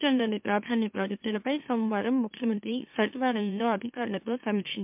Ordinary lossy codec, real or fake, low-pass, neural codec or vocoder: MP3, 32 kbps; fake; 3.6 kHz; autoencoder, 44.1 kHz, a latent of 192 numbers a frame, MeloTTS